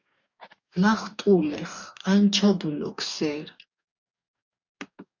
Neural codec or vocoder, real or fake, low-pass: codec, 44.1 kHz, 2.6 kbps, DAC; fake; 7.2 kHz